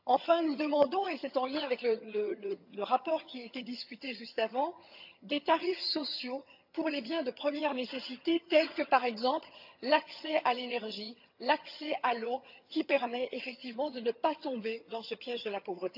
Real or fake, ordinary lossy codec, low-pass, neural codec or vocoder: fake; none; 5.4 kHz; vocoder, 22.05 kHz, 80 mel bands, HiFi-GAN